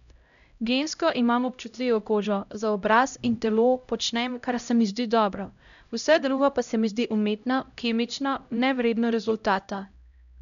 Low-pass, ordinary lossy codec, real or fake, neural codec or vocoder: 7.2 kHz; none; fake; codec, 16 kHz, 0.5 kbps, X-Codec, HuBERT features, trained on LibriSpeech